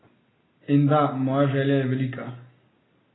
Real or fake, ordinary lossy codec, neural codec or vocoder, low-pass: real; AAC, 16 kbps; none; 7.2 kHz